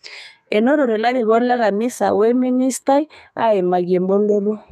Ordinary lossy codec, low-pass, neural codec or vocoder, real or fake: none; 14.4 kHz; codec, 32 kHz, 1.9 kbps, SNAC; fake